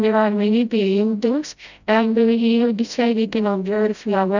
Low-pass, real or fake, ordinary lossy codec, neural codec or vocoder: 7.2 kHz; fake; none; codec, 16 kHz, 0.5 kbps, FreqCodec, smaller model